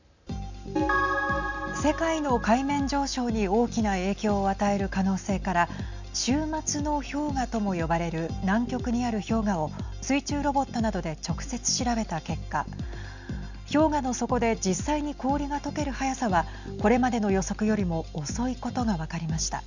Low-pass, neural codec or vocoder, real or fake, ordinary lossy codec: 7.2 kHz; none; real; none